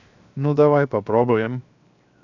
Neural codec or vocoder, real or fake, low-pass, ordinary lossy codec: codec, 16 kHz, 0.7 kbps, FocalCodec; fake; 7.2 kHz; none